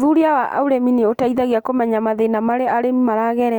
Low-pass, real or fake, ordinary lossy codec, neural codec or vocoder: 19.8 kHz; real; Opus, 32 kbps; none